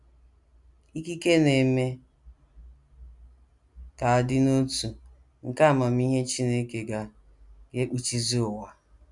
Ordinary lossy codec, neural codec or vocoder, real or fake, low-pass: none; none; real; 10.8 kHz